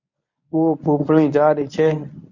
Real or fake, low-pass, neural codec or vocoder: fake; 7.2 kHz; codec, 24 kHz, 0.9 kbps, WavTokenizer, medium speech release version 1